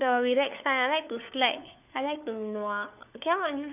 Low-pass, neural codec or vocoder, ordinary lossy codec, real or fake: 3.6 kHz; codec, 16 kHz, 4 kbps, FunCodec, trained on LibriTTS, 50 frames a second; none; fake